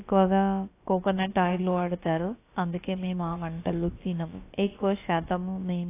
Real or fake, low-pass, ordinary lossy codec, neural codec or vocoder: fake; 3.6 kHz; AAC, 24 kbps; codec, 16 kHz, about 1 kbps, DyCAST, with the encoder's durations